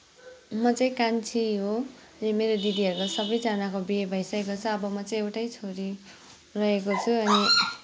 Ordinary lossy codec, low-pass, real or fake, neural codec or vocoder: none; none; real; none